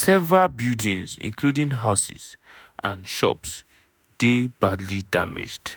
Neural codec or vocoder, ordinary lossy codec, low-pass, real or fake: autoencoder, 48 kHz, 32 numbers a frame, DAC-VAE, trained on Japanese speech; none; none; fake